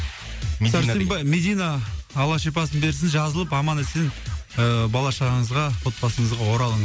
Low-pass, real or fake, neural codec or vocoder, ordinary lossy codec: none; real; none; none